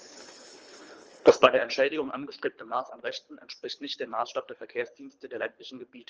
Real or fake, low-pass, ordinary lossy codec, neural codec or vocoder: fake; 7.2 kHz; Opus, 24 kbps; codec, 24 kHz, 3 kbps, HILCodec